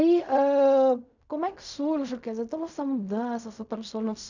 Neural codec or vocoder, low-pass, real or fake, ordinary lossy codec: codec, 16 kHz in and 24 kHz out, 0.4 kbps, LongCat-Audio-Codec, fine tuned four codebook decoder; 7.2 kHz; fake; none